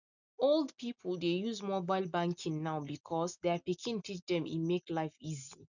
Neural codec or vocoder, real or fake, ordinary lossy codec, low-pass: none; real; none; 7.2 kHz